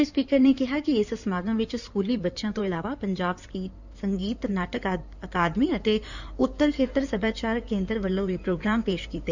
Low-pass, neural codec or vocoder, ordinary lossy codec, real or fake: 7.2 kHz; codec, 16 kHz in and 24 kHz out, 2.2 kbps, FireRedTTS-2 codec; none; fake